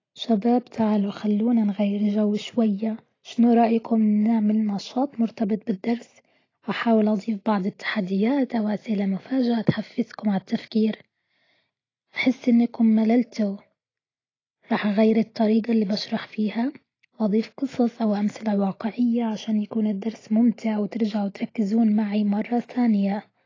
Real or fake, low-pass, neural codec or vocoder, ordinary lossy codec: real; 7.2 kHz; none; AAC, 32 kbps